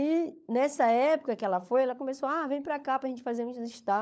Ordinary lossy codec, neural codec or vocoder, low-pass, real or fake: none; codec, 16 kHz, 16 kbps, FunCodec, trained on LibriTTS, 50 frames a second; none; fake